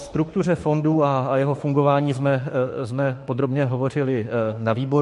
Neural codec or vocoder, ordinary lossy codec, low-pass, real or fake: autoencoder, 48 kHz, 32 numbers a frame, DAC-VAE, trained on Japanese speech; MP3, 48 kbps; 14.4 kHz; fake